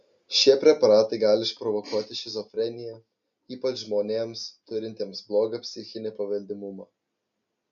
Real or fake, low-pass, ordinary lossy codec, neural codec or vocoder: real; 7.2 kHz; MP3, 48 kbps; none